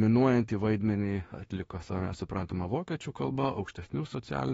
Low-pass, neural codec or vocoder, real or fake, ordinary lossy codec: 19.8 kHz; autoencoder, 48 kHz, 32 numbers a frame, DAC-VAE, trained on Japanese speech; fake; AAC, 24 kbps